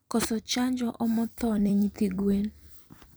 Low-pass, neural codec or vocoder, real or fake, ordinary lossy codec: none; vocoder, 44.1 kHz, 128 mel bands every 256 samples, BigVGAN v2; fake; none